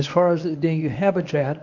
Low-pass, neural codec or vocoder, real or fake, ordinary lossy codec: 7.2 kHz; codec, 24 kHz, 0.9 kbps, WavTokenizer, medium speech release version 1; fake; AAC, 48 kbps